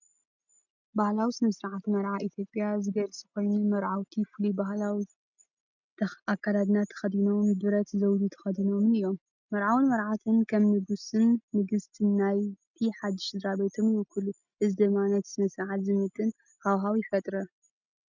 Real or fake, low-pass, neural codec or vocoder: real; 7.2 kHz; none